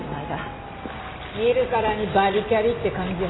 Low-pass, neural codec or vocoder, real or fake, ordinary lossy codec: 7.2 kHz; none; real; AAC, 16 kbps